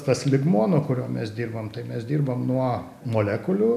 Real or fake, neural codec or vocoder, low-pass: real; none; 14.4 kHz